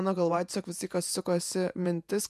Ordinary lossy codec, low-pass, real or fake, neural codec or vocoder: MP3, 96 kbps; 14.4 kHz; fake; vocoder, 48 kHz, 128 mel bands, Vocos